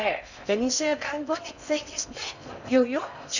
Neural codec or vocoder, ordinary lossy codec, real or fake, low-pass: codec, 16 kHz in and 24 kHz out, 0.6 kbps, FocalCodec, streaming, 4096 codes; none; fake; 7.2 kHz